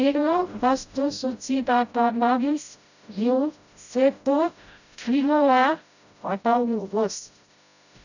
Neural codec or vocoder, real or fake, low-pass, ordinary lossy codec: codec, 16 kHz, 0.5 kbps, FreqCodec, smaller model; fake; 7.2 kHz; none